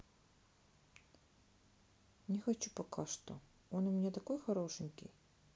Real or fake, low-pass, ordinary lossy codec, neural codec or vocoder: real; none; none; none